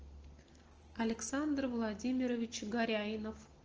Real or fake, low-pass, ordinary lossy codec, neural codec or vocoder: real; 7.2 kHz; Opus, 16 kbps; none